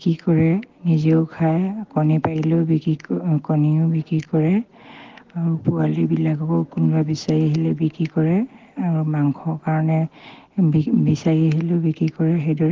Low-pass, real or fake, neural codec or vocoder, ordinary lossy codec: 7.2 kHz; real; none; Opus, 16 kbps